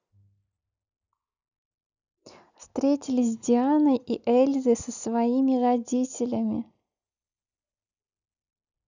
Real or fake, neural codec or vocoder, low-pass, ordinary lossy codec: real; none; 7.2 kHz; none